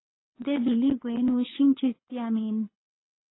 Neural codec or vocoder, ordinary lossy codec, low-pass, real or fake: codec, 24 kHz, 6 kbps, HILCodec; AAC, 16 kbps; 7.2 kHz; fake